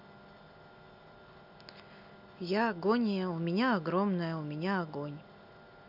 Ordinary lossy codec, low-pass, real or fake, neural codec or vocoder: none; 5.4 kHz; real; none